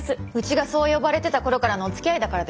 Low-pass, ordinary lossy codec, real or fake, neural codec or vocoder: none; none; real; none